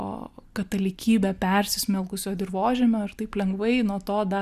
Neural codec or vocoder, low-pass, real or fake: none; 14.4 kHz; real